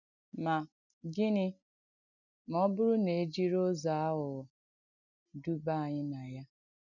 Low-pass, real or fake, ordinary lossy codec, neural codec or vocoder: 7.2 kHz; real; none; none